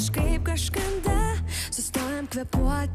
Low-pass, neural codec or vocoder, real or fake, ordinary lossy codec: 14.4 kHz; none; real; Opus, 64 kbps